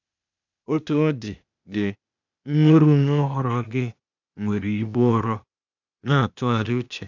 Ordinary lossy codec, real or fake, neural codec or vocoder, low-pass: none; fake; codec, 16 kHz, 0.8 kbps, ZipCodec; 7.2 kHz